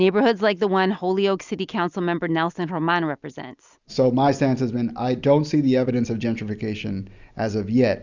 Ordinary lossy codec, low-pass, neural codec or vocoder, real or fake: Opus, 64 kbps; 7.2 kHz; none; real